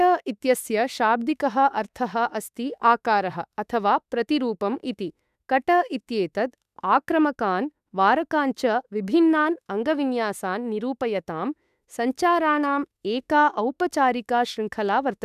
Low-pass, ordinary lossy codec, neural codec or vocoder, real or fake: 14.4 kHz; none; autoencoder, 48 kHz, 32 numbers a frame, DAC-VAE, trained on Japanese speech; fake